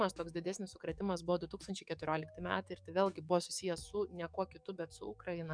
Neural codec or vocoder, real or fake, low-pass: codec, 44.1 kHz, 7.8 kbps, DAC; fake; 9.9 kHz